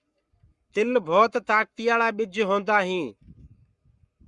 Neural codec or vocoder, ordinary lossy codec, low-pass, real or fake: codec, 44.1 kHz, 7.8 kbps, Pupu-Codec; Opus, 64 kbps; 10.8 kHz; fake